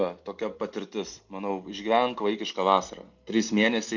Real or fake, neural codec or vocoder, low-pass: real; none; 7.2 kHz